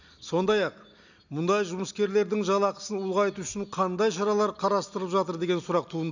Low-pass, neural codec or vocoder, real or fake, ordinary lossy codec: 7.2 kHz; none; real; none